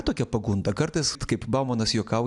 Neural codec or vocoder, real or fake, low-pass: none; real; 10.8 kHz